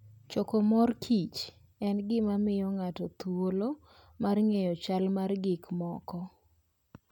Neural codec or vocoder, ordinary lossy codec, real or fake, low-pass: none; none; real; 19.8 kHz